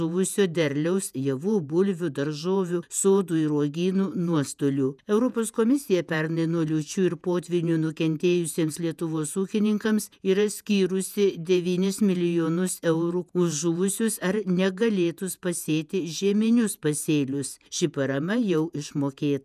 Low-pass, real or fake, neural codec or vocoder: 14.4 kHz; fake; vocoder, 48 kHz, 128 mel bands, Vocos